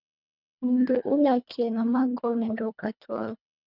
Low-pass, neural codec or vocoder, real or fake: 5.4 kHz; codec, 24 kHz, 1.5 kbps, HILCodec; fake